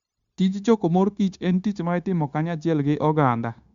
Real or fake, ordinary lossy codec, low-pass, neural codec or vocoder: fake; Opus, 64 kbps; 7.2 kHz; codec, 16 kHz, 0.9 kbps, LongCat-Audio-Codec